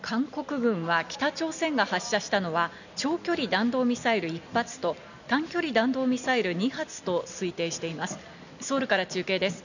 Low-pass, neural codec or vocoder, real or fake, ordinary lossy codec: 7.2 kHz; none; real; none